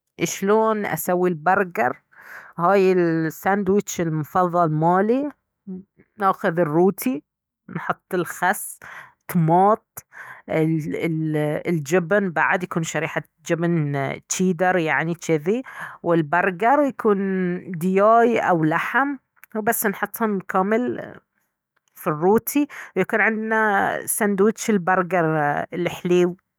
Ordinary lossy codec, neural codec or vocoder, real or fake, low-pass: none; autoencoder, 48 kHz, 128 numbers a frame, DAC-VAE, trained on Japanese speech; fake; none